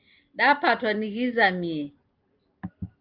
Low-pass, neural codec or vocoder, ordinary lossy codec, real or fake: 5.4 kHz; none; Opus, 32 kbps; real